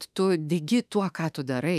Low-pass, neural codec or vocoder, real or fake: 14.4 kHz; autoencoder, 48 kHz, 32 numbers a frame, DAC-VAE, trained on Japanese speech; fake